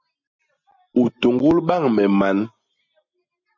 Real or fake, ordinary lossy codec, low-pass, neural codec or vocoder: real; MP3, 64 kbps; 7.2 kHz; none